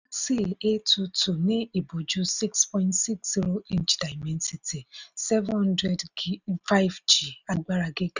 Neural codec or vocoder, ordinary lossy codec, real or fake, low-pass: none; none; real; 7.2 kHz